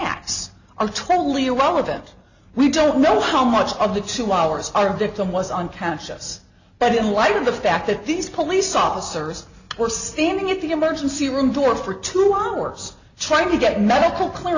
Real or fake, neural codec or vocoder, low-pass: real; none; 7.2 kHz